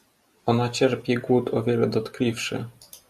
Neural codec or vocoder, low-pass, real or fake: none; 14.4 kHz; real